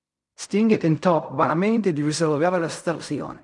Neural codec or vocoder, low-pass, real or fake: codec, 16 kHz in and 24 kHz out, 0.4 kbps, LongCat-Audio-Codec, fine tuned four codebook decoder; 10.8 kHz; fake